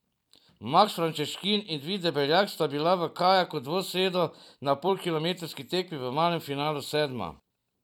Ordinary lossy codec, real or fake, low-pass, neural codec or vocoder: none; fake; 19.8 kHz; vocoder, 44.1 kHz, 128 mel bands every 256 samples, BigVGAN v2